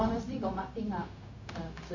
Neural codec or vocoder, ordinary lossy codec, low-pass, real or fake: codec, 16 kHz, 0.4 kbps, LongCat-Audio-Codec; none; 7.2 kHz; fake